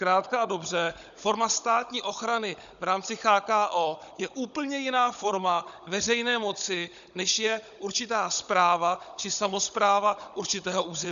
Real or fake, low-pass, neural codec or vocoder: fake; 7.2 kHz; codec, 16 kHz, 16 kbps, FunCodec, trained on Chinese and English, 50 frames a second